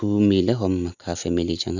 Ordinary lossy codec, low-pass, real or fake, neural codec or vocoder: none; 7.2 kHz; real; none